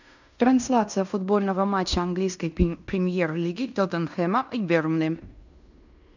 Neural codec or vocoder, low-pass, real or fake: codec, 16 kHz in and 24 kHz out, 0.9 kbps, LongCat-Audio-Codec, fine tuned four codebook decoder; 7.2 kHz; fake